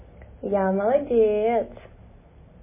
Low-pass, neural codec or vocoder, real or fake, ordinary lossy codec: 3.6 kHz; none; real; MP3, 16 kbps